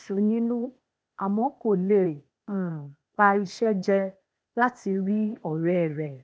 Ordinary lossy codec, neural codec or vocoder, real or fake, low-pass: none; codec, 16 kHz, 0.8 kbps, ZipCodec; fake; none